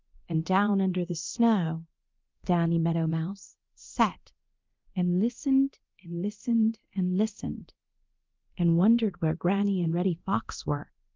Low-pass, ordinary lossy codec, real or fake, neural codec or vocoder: 7.2 kHz; Opus, 32 kbps; fake; codec, 24 kHz, 0.9 kbps, DualCodec